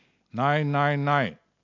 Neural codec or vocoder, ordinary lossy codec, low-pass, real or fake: none; AAC, 48 kbps; 7.2 kHz; real